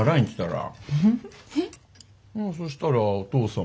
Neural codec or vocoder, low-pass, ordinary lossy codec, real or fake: none; none; none; real